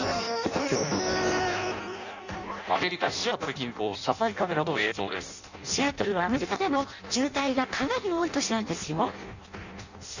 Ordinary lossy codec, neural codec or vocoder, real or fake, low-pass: none; codec, 16 kHz in and 24 kHz out, 0.6 kbps, FireRedTTS-2 codec; fake; 7.2 kHz